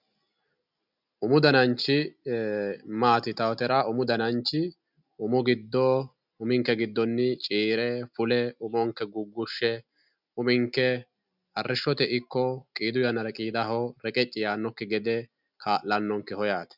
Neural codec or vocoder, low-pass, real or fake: none; 5.4 kHz; real